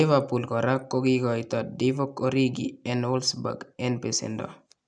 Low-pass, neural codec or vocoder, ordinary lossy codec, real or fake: 9.9 kHz; none; none; real